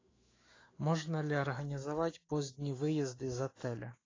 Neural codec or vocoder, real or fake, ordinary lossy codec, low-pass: autoencoder, 48 kHz, 128 numbers a frame, DAC-VAE, trained on Japanese speech; fake; AAC, 32 kbps; 7.2 kHz